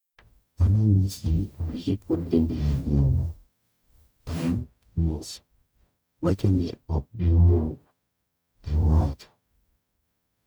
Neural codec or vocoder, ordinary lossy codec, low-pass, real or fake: codec, 44.1 kHz, 0.9 kbps, DAC; none; none; fake